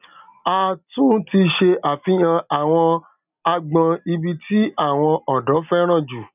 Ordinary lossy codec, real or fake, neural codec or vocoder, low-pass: none; real; none; 3.6 kHz